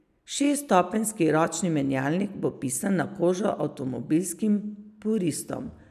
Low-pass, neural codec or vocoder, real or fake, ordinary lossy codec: 14.4 kHz; none; real; none